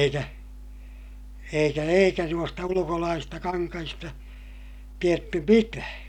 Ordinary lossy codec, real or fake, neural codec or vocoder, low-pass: none; real; none; 19.8 kHz